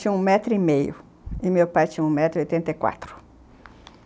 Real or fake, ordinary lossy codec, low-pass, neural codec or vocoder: real; none; none; none